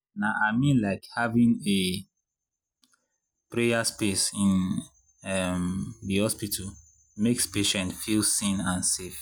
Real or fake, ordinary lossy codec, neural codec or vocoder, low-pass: real; none; none; none